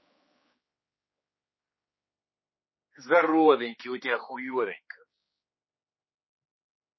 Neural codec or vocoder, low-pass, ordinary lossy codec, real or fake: codec, 16 kHz, 4 kbps, X-Codec, HuBERT features, trained on general audio; 7.2 kHz; MP3, 24 kbps; fake